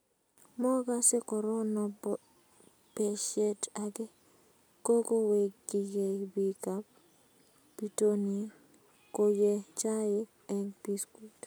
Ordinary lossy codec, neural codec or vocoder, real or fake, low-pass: none; none; real; none